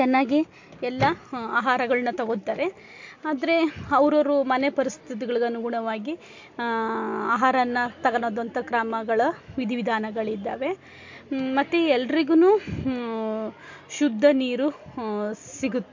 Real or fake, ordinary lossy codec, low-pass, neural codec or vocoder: real; MP3, 48 kbps; 7.2 kHz; none